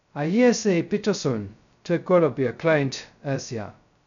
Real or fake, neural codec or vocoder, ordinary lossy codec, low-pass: fake; codec, 16 kHz, 0.2 kbps, FocalCodec; none; 7.2 kHz